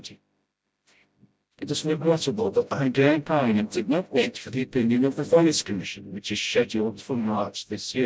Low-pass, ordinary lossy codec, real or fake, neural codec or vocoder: none; none; fake; codec, 16 kHz, 0.5 kbps, FreqCodec, smaller model